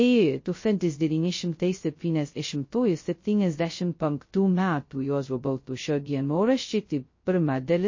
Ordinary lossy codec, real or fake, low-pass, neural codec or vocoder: MP3, 32 kbps; fake; 7.2 kHz; codec, 16 kHz, 0.2 kbps, FocalCodec